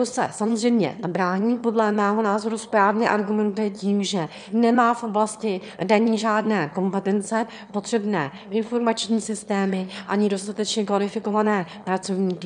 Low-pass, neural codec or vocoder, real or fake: 9.9 kHz; autoencoder, 22.05 kHz, a latent of 192 numbers a frame, VITS, trained on one speaker; fake